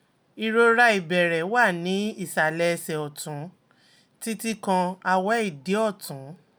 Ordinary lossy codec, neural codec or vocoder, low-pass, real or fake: none; none; none; real